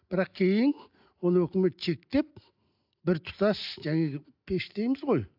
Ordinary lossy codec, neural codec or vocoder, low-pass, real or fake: AAC, 48 kbps; none; 5.4 kHz; real